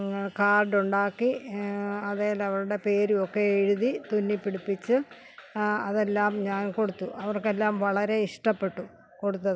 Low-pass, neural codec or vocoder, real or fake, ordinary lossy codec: none; none; real; none